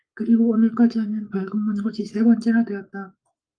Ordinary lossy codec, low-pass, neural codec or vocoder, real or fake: Opus, 32 kbps; 9.9 kHz; codec, 44.1 kHz, 7.8 kbps, Pupu-Codec; fake